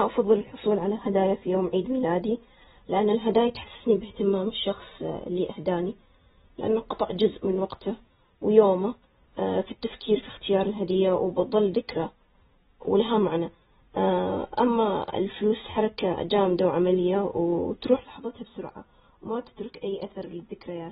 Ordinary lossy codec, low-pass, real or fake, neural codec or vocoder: AAC, 16 kbps; 9.9 kHz; real; none